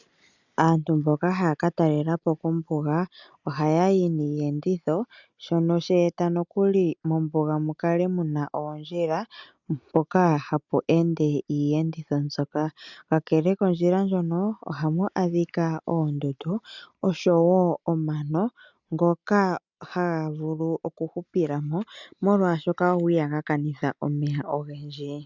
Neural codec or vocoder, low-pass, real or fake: none; 7.2 kHz; real